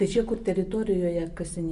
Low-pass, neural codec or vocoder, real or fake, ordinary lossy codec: 10.8 kHz; none; real; MP3, 96 kbps